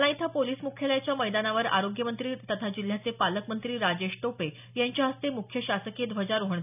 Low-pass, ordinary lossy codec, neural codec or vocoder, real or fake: 3.6 kHz; none; none; real